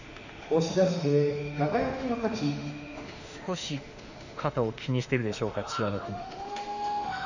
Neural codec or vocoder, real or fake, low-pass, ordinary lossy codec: autoencoder, 48 kHz, 32 numbers a frame, DAC-VAE, trained on Japanese speech; fake; 7.2 kHz; none